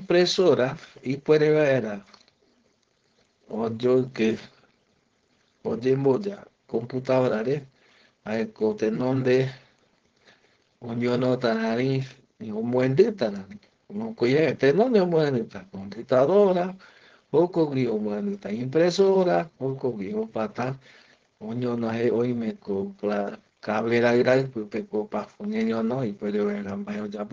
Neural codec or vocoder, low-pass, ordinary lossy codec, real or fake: codec, 16 kHz, 4.8 kbps, FACodec; 7.2 kHz; Opus, 16 kbps; fake